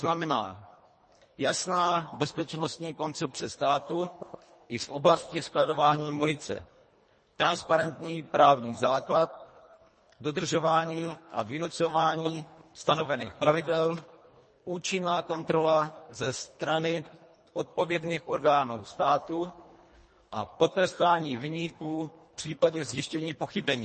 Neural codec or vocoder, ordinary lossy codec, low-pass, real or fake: codec, 24 kHz, 1.5 kbps, HILCodec; MP3, 32 kbps; 10.8 kHz; fake